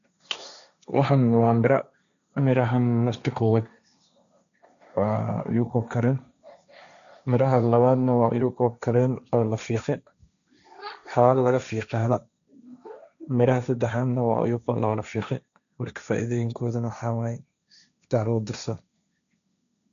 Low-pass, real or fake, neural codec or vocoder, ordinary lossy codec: 7.2 kHz; fake; codec, 16 kHz, 1.1 kbps, Voila-Tokenizer; none